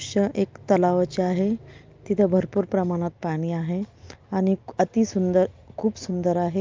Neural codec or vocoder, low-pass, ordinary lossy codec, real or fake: none; 7.2 kHz; Opus, 32 kbps; real